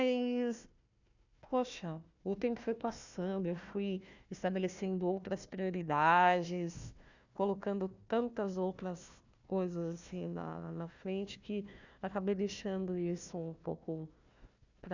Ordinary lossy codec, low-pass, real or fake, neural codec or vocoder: none; 7.2 kHz; fake; codec, 16 kHz, 1 kbps, FunCodec, trained on Chinese and English, 50 frames a second